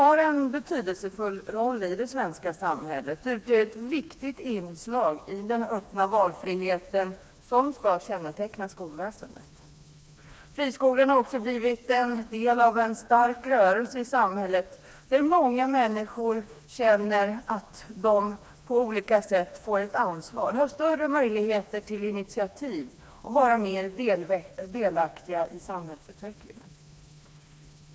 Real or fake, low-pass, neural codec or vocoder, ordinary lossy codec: fake; none; codec, 16 kHz, 2 kbps, FreqCodec, smaller model; none